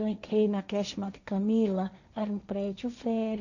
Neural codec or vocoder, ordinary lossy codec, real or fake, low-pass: codec, 16 kHz, 1.1 kbps, Voila-Tokenizer; none; fake; 7.2 kHz